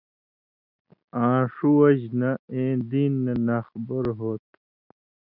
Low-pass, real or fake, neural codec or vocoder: 5.4 kHz; real; none